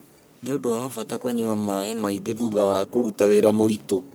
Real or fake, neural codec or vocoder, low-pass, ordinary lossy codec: fake; codec, 44.1 kHz, 1.7 kbps, Pupu-Codec; none; none